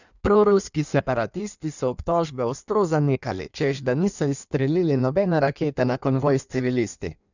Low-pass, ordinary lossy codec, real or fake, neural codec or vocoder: 7.2 kHz; none; fake; codec, 16 kHz in and 24 kHz out, 1.1 kbps, FireRedTTS-2 codec